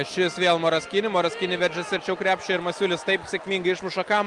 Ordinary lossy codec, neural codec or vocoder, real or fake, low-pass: Opus, 32 kbps; none; real; 10.8 kHz